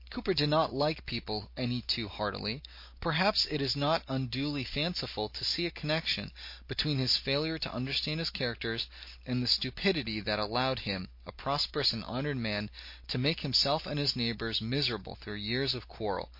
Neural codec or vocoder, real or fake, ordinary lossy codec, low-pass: none; real; MP3, 32 kbps; 5.4 kHz